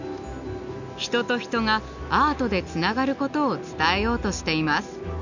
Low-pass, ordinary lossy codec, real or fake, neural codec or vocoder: 7.2 kHz; none; real; none